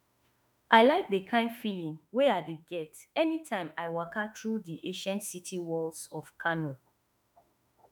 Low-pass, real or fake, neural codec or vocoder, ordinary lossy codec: none; fake; autoencoder, 48 kHz, 32 numbers a frame, DAC-VAE, trained on Japanese speech; none